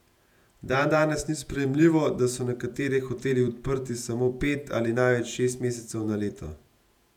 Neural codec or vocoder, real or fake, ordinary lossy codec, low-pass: vocoder, 48 kHz, 128 mel bands, Vocos; fake; none; 19.8 kHz